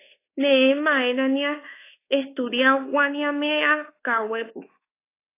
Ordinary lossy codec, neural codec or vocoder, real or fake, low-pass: AAC, 24 kbps; codec, 24 kHz, 1.2 kbps, DualCodec; fake; 3.6 kHz